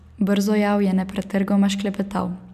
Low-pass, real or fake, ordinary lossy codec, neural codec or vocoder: 14.4 kHz; real; none; none